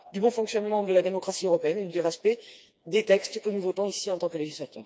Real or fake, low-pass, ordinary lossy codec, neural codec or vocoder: fake; none; none; codec, 16 kHz, 2 kbps, FreqCodec, smaller model